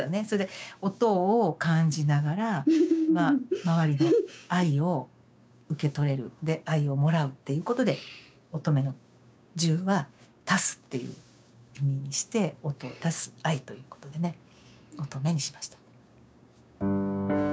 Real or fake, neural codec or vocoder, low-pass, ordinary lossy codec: fake; codec, 16 kHz, 6 kbps, DAC; none; none